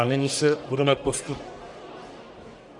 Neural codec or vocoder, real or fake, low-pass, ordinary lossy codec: codec, 44.1 kHz, 1.7 kbps, Pupu-Codec; fake; 10.8 kHz; MP3, 96 kbps